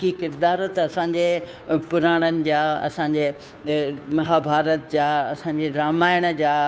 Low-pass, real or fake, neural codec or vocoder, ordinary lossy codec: none; fake; codec, 16 kHz, 2 kbps, FunCodec, trained on Chinese and English, 25 frames a second; none